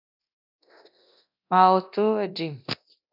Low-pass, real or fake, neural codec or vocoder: 5.4 kHz; fake; codec, 24 kHz, 0.9 kbps, DualCodec